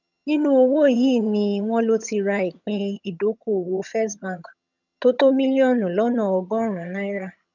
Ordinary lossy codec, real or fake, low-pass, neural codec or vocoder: none; fake; 7.2 kHz; vocoder, 22.05 kHz, 80 mel bands, HiFi-GAN